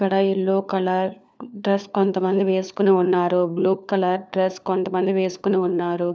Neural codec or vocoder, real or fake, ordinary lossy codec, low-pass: codec, 16 kHz, 4 kbps, FunCodec, trained on LibriTTS, 50 frames a second; fake; none; none